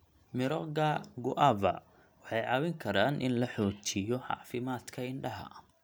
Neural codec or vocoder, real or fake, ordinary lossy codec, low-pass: none; real; none; none